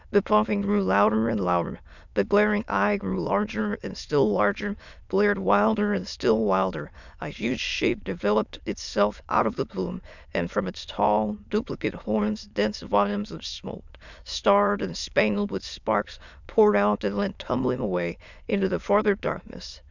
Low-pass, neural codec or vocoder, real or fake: 7.2 kHz; autoencoder, 22.05 kHz, a latent of 192 numbers a frame, VITS, trained on many speakers; fake